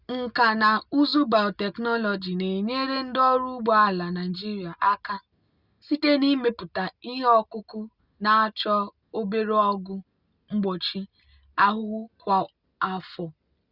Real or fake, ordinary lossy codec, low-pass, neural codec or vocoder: real; Opus, 64 kbps; 5.4 kHz; none